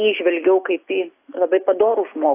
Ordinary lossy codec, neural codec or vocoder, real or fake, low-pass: AAC, 24 kbps; none; real; 3.6 kHz